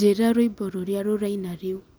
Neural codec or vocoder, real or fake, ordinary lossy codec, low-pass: none; real; none; none